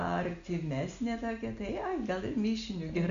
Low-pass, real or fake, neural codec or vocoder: 7.2 kHz; real; none